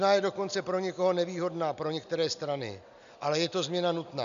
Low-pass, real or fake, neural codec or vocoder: 7.2 kHz; real; none